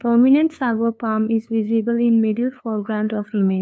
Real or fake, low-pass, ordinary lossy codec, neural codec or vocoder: fake; none; none; codec, 16 kHz, 2 kbps, FunCodec, trained on LibriTTS, 25 frames a second